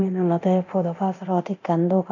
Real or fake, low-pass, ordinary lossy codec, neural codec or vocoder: fake; 7.2 kHz; none; codec, 24 kHz, 0.9 kbps, DualCodec